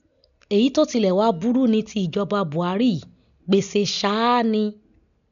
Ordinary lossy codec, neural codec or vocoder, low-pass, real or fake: none; none; 7.2 kHz; real